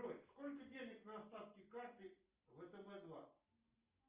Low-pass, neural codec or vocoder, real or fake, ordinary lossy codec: 3.6 kHz; none; real; Opus, 24 kbps